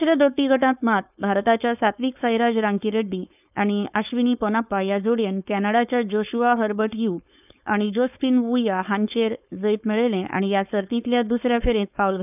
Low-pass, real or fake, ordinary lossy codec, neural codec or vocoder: 3.6 kHz; fake; none; codec, 16 kHz, 4.8 kbps, FACodec